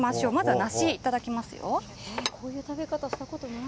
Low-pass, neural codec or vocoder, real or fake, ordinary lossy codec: none; none; real; none